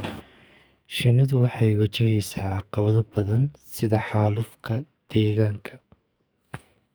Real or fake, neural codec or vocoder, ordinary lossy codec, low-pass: fake; codec, 44.1 kHz, 2.6 kbps, SNAC; none; none